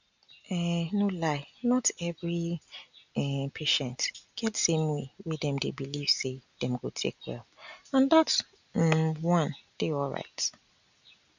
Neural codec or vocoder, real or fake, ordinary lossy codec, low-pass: none; real; none; 7.2 kHz